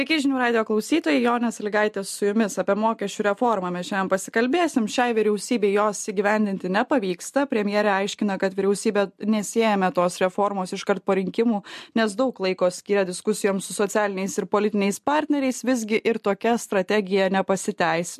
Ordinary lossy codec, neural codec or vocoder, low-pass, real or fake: MP3, 64 kbps; none; 14.4 kHz; real